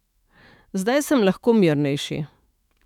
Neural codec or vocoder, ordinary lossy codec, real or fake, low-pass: autoencoder, 48 kHz, 128 numbers a frame, DAC-VAE, trained on Japanese speech; none; fake; 19.8 kHz